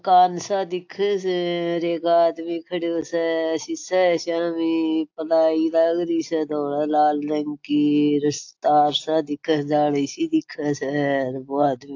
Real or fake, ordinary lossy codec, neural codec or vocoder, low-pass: real; AAC, 48 kbps; none; 7.2 kHz